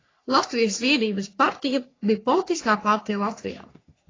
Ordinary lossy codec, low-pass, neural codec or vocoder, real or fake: AAC, 32 kbps; 7.2 kHz; codec, 44.1 kHz, 3.4 kbps, Pupu-Codec; fake